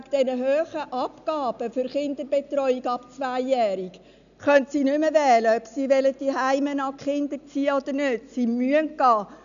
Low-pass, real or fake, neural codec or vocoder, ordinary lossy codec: 7.2 kHz; real; none; none